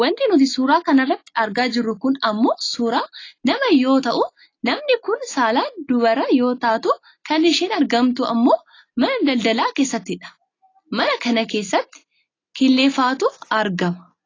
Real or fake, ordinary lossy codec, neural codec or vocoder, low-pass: real; AAC, 32 kbps; none; 7.2 kHz